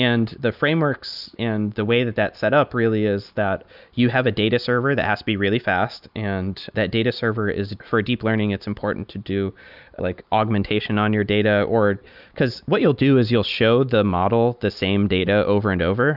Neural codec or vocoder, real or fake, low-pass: none; real; 5.4 kHz